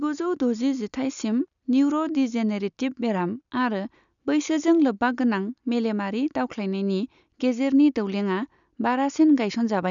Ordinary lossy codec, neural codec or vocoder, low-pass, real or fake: none; none; 7.2 kHz; real